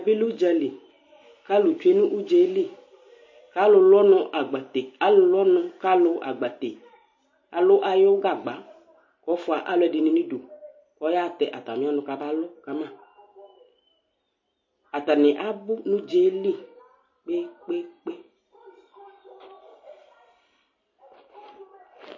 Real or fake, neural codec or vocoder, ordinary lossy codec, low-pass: real; none; MP3, 32 kbps; 7.2 kHz